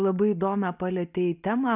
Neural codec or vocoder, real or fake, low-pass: none; real; 3.6 kHz